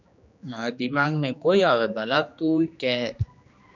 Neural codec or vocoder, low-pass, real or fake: codec, 16 kHz, 2 kbps, X-Codec, HuBERT features, trained on general audio; 7.2 kHz; fake